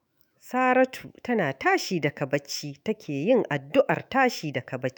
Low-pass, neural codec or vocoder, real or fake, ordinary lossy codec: none; autoencoder, 48 kHz, 128 numbers a frame, DAC-VAE, trained on Japanese speech; fake; none